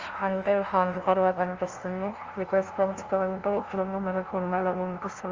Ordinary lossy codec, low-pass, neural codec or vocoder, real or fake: Opus, 24 kbps; 7.2 kHz; codec, 16 kHz, 0.5 kbps, FunCodec, trained on LibriTTS, 25 frames a second; fake